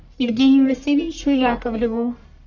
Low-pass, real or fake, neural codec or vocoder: 7.2 kHz; fake; codec, 44.1 kHz, 1.7 kbps, Pupu-Codec